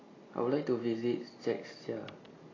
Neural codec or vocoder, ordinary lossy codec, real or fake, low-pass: none; AAC, 32 kbps; real; 7.2 kHz